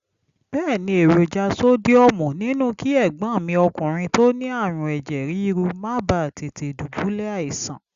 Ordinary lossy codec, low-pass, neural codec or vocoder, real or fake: Opus, 64 kbps; 7.2 kHz; none; real